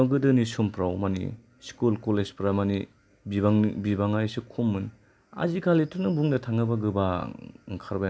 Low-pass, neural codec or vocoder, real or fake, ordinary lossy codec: none; none; real; none